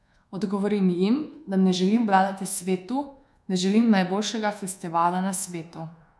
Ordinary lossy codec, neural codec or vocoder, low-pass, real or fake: none; codec, 24 kHz, 1.2 kbps, DualCodec; none; fake